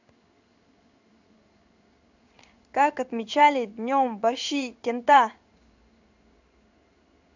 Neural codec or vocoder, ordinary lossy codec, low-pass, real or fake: codec, 16 kHz in and 24 kHz out, 1 kbps, XY-Tokenizer; none; 7.2 kHz; fake